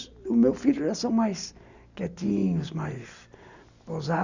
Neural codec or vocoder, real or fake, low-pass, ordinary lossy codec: none; real; 7.2 kHz; none